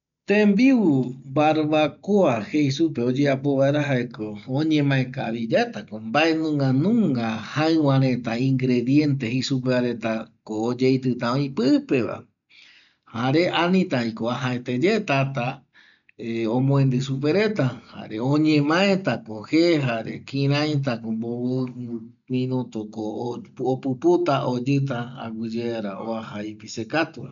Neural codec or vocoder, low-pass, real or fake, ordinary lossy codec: none; 7.2 kHz; real; none